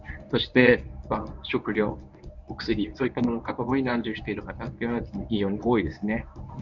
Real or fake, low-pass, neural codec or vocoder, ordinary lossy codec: fake; 7.2 kHz; codec, 24 kHz, 0.9 kbps, WavTokenizer, medium speech release version 1; none